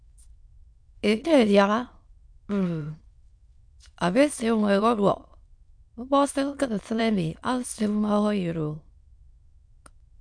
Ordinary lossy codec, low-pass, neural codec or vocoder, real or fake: MP3, 64 kbps; 9.9 kHz; autoencoder, 22.05 kHz, a latent of 192 numbers a frame, VITS, trained on many speakers; fake